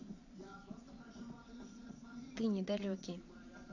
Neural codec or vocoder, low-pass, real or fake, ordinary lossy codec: vocoder, 22.05 kHz, 80 mel bands, Vocos; 7.2 kHz; fake; none